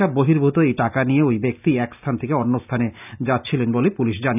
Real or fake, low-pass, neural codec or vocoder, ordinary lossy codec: real; 3.6 kHz; none; none